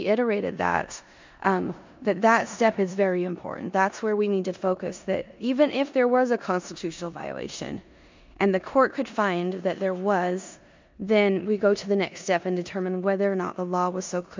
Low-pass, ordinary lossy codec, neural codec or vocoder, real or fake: 7.2 kHz; MP3, 64 kbps; codec, 16 kHz in and 24 kHz out, 0.9 kbps, LongCat-Audio-Codec, four codebook decoder; fake